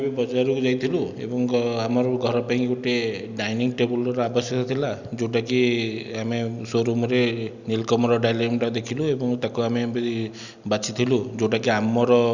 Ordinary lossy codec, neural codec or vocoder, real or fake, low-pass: none; none; real; 7.2 kHz